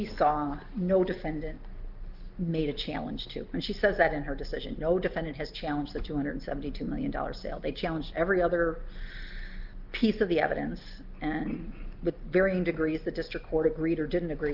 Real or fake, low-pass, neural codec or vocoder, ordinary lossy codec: real; 5.4 kHz; none; Opus, 32 kbps